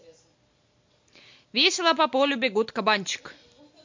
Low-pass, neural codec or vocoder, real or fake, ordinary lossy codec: 7.2 kHz; none; real; MP3, 48 kbps